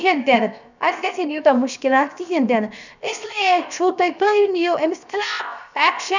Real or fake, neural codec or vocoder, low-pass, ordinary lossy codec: fake; codec, 16 kHz, 0.7 kbps, FocalCodec; 7.2 kHz; none